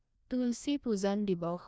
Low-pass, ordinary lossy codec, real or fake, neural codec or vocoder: none; none; fake; codec, 16 kHz, 1 kbps, FreqCodec, larger model